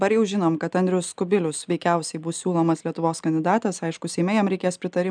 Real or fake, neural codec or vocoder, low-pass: real; none; 9.9 kHz